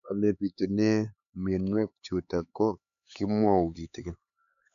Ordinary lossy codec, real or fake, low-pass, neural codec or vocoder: none; fake; 7.2 kHz; codec, 16 kHz, 4 kbps, X-Codec, HuBERT features, trained on LibriSpeech